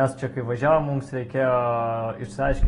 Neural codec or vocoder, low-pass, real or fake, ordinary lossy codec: none; 10.8 kHz; real; AAC, 32 kbps